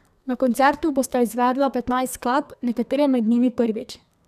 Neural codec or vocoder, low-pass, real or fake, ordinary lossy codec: codec, 32 kHz, 1.9 kbps, SNAC; 14.4 kHz; fake; none